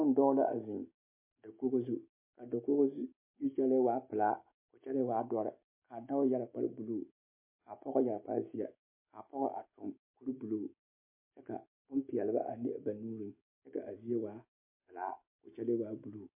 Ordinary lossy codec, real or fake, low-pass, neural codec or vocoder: MP3, 24 kbps; real; 3.6 kHz; none